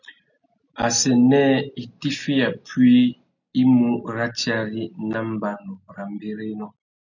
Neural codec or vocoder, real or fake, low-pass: none; real; 7.2 kHz